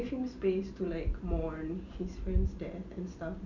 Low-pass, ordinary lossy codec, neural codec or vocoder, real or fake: 7.2 kHz; none; none; real